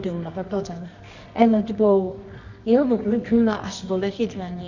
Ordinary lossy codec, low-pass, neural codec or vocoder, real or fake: none; 7.2 kHz; codec, 24 kHz, 0.9 kbps, WavTokenizer, medium music audio release; fake